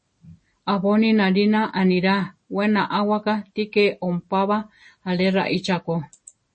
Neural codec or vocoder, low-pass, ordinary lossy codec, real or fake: none; 9.9 kHz; MP3, 32 kbps; real